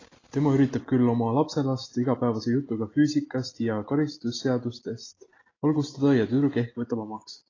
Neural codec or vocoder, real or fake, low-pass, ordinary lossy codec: none; real; 7.2 kHz; AAC, 32 kbps